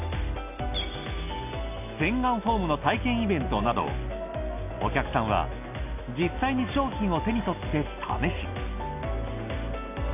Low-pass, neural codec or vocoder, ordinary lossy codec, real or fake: 3.6 kHz; none; AAC, 32 kbps; real